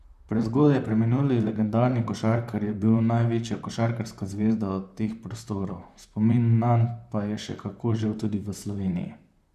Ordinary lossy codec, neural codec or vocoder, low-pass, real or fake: none; vocoder, 44.1 kHz, 128 mel bands, Pupu-Vocoder; 14.4 kHz; fake